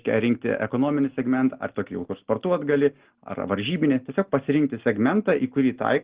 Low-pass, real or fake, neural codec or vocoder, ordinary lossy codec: 3.6 kHz; real; none; Opus, 16 kbps